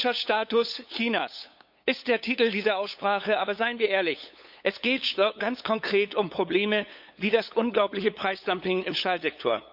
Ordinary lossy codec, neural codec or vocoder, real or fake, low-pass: none; codec, 16 kHz, 8 kbps, FunCodec, trained on LibriTTS, 25 frames a second; fake; 5.4 kHz